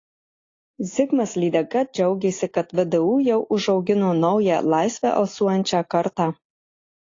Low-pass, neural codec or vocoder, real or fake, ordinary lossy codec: 7.2 kHz; none; real; AAC, 32 kbps